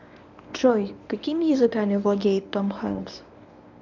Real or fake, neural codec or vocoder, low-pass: fake; codec, 24 kHz, 0.9 kbps, WavTokenizer, medium speech release version 1; 7.2 kHz